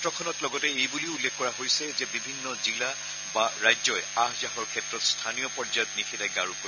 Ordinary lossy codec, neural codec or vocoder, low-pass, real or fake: none; none; 7.2 kHz; real